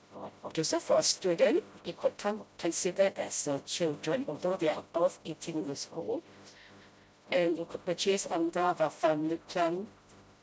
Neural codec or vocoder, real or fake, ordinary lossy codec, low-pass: codec, 16 kHz, 0.5 kbps, FreqCodec, smaller model; fake; none; none